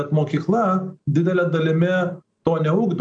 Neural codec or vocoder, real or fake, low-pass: none; real; 9.9 kHz